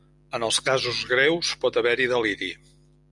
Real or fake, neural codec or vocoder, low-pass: real; none; 10.8 kHz